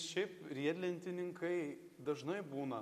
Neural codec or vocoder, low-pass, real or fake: none; 14.4 kHz; real